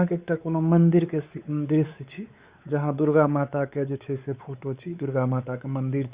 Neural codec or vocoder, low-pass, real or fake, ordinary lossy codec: codec, 16 kHz, 4 kbps, X-Codec, WavLM features, trained on Multilingual LibriSpeech; 3.6 kHz; fake; Opus, 64 kbps